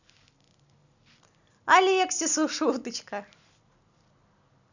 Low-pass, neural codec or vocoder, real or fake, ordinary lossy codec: 7.2 kHz; none; real; none